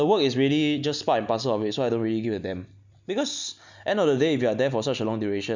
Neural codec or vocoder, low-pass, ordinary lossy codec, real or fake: none; 7.2 kHz; none; real